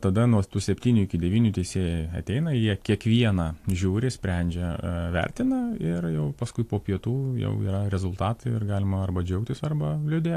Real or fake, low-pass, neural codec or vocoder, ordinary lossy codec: real; 14.4 kHz; none; AAC, 64 kbps